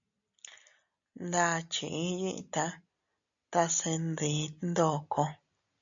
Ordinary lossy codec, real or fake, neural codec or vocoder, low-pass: MP3, 64 kbps; real; none; 7.2 kHz